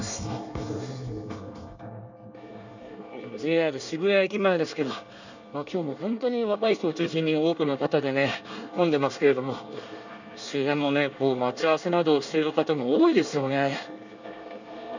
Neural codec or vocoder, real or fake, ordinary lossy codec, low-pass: codec, 24 kHz, 1 kbps, SNAC; fake; none; 7.2 kHz